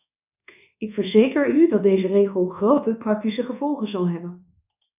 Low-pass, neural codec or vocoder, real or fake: 3.6 kHz; codec, 24 kHz, 1.2 kbps, DualCodec; fake